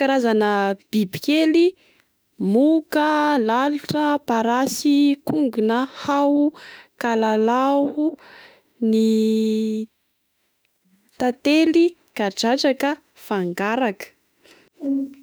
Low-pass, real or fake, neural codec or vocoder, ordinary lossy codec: none; fake; autoencoder, 48 kHz, 32 numbers a frame, DAC-VAE, trained on Japanese speech; none